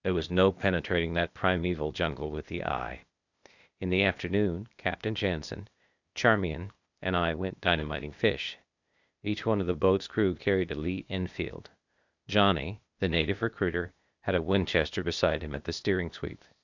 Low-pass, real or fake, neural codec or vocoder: 7.2 kHz; fake; codec, 16 kHz, 0.8 kbps, ZipCodec